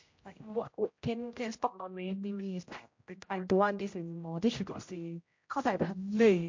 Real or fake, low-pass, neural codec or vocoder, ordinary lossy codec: fake; 7.2 kHz; codec, 16 kHz, 0.5 kbps, X-Codec, HuBERT features, trained on general audio; MP3, 48 kbps